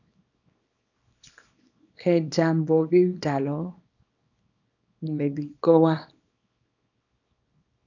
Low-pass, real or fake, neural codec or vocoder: 7.2 kHz; fake; codec, 24 kHz, 0.9 kbps, WavTokenizer, small release